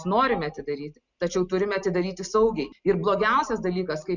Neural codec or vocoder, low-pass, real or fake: none; 7.2 kHz; real